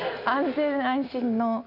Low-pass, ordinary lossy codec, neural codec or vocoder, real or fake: 5.4 kHz; none; none; real